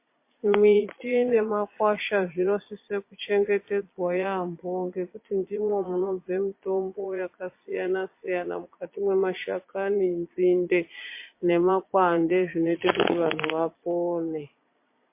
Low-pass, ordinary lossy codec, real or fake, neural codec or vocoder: 3.6 kHz; MP3, 24 kbps; fake; vocoder, 22.05 kHz, 80 mel bands, Vocos